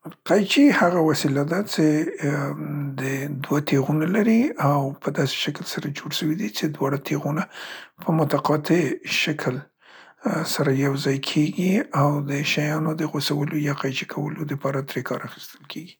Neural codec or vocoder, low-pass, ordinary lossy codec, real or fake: none; none; none; real